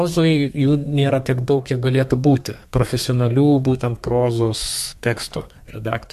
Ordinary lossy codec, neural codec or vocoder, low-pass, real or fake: MP3, 64 kbps; codec, 32 kHz, 1.9 kbps, SNAC; 14.4 kHz; fake